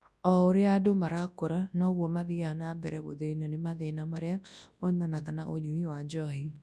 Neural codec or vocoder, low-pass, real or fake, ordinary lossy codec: codec, 24 kHz, 0.9 kbps, WavTokenizer, large speech release; none; fake; none